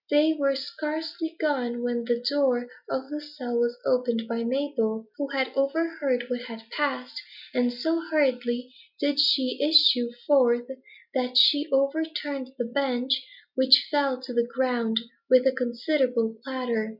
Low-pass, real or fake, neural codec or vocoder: 5.4 kHz; real; none